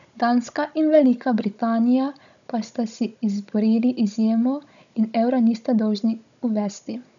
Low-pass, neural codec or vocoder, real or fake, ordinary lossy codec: 7.2 kHz; codec, 16 kHz, 16 kbps, FunCodec, trained on Chinese and English, 50 frames a second; fake; none